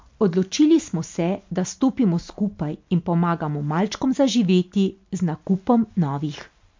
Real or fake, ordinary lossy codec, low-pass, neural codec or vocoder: real; MP3, 48 kbps; 7.2 kHz; none